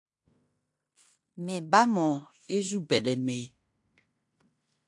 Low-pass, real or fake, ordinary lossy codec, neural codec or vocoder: 10.8 kHz; fake; AAC, 64 kbps; codec, 16 kHz in and 24 kHz out, 0.9 kbps, LongCat-Audio-Codec, fine tuned four codebook decoder